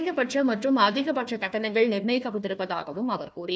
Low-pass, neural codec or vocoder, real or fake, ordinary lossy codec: none; codec, 16 kHz, 1 kbps, FunCodec, trained on Chinese and English, 50 frames a second; fake; none